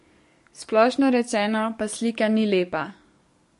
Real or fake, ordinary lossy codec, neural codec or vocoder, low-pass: fake; MP3, 48 kbps; codec, 44.1 kHz, 7.8 kbps, DAC; 14.4 kHz